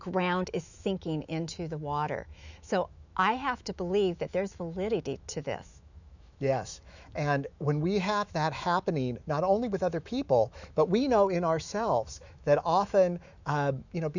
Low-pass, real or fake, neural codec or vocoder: 7.2 kHz; fake; autoencoder, 48 kHz, 128 numbers a frame, DAC-VAE, trained on Japanese speech